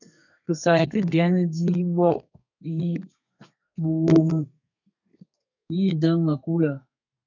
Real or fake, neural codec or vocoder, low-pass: fake; codec, 32 kHz, 1.9 kbps, SNAC; 7.2 kHz